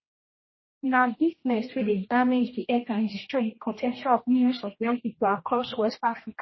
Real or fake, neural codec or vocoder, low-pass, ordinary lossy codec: fake; codec, 16 kHz, 1 kbps, X-Codec, HuBERT features, trained on general audio; 7.2 kHz; MP3, 24 kbps